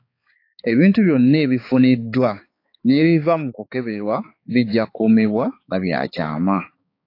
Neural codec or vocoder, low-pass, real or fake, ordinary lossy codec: codec, 16 kHz, 4 kbps, X-Codec, HuBERT features, trained on balanced general audio; 5.4 kHz; fake; AAC, 32 kbps